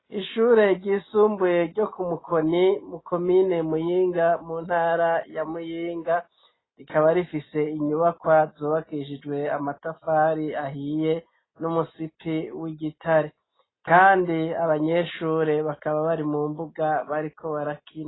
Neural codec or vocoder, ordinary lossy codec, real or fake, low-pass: none; AAC, 16 kbps; real; 7.2 kHz